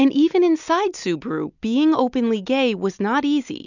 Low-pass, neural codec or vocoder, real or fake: 7.2 kHz; none; real